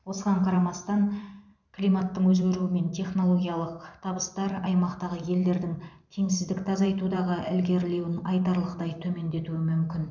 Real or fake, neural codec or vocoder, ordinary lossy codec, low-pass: fake; vocoder, 44.1 kHz, 128 mel bands every 512 samples, BigVGAN v2; none; 7.2 kHz